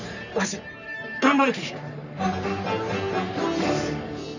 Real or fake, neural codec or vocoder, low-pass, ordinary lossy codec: fake; codec, 44.1 kHz, 3.4 kbps, Pupu-Codec; 7.2 kHz; none